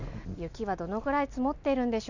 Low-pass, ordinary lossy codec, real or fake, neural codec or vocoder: 7.2 kHz; none; real; none